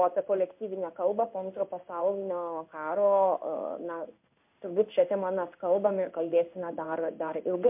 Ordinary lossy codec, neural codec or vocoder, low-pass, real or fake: MP3, 32 kbps; codec, 16 kHz in and 24 kHz out, 1 kbps, XY-Tokenizer; 3.6 kHz; fake